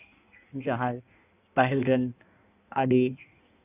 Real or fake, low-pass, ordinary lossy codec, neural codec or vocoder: fake; 3.6 kHz; none; codec, 16 kHz in and 24 kHz out, 1.1 kbps, FireRedTTS-2 codec